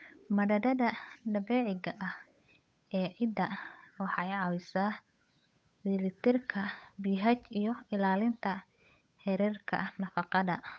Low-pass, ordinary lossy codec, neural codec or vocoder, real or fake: none; none; codec, 16 kHz, 8 kbps, FunCodec, trained on Chinese and English, 25 frames a second; fake